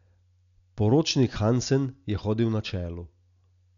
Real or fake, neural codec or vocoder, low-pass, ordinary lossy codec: real; none; 7.2 kHz; none